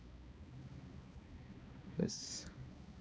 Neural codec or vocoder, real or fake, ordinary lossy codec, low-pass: codec, 16 kHz, 2 kbps, X-Codec, HuBERT features, trained on balanced general audio; fake; none; none